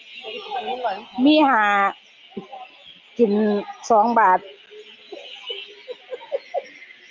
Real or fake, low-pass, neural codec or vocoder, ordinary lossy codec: real; 7.2 kHz; none; Opus, 24 kbps